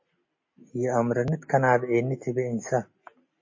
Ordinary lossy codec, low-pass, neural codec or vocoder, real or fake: MP3, 32 kbps; 7.2 kHz; none; real